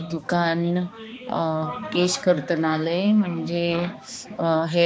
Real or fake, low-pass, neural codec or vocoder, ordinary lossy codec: fake; none; codec, 16 kHz, 2 kbps, X-Codec, HuBERT features, trained on balanced general audio; none